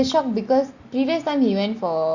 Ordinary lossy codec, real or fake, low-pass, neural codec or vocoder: Opus, 64 kbps; real; 7.2 kHz; none